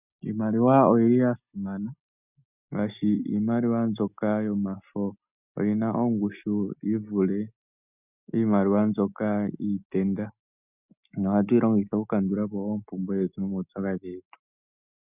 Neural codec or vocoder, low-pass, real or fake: none; 3.6 kHz; real